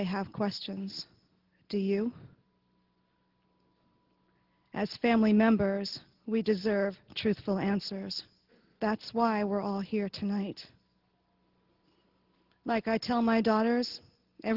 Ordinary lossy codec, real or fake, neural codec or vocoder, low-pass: Opus, 16 kbps; real; none; 5.4 kHz